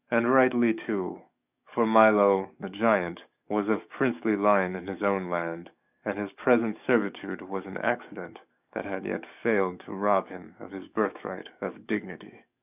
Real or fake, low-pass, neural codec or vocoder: fake; 3.6 kHz; codec, 44.1 kHz, 7.8 kbps, DAC